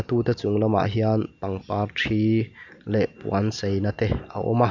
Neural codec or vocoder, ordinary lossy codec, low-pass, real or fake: none; none; 7.2 kHz; real